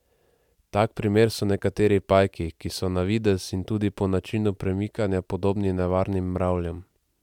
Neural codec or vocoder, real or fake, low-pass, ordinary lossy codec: none; real; 19.8 kHz; none